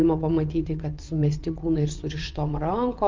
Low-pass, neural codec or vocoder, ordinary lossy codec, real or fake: 7.2 kHz; vocoder, 22.05 kHz, 80 mel bands, WaveNeXt; Opus, 24 kbps; fake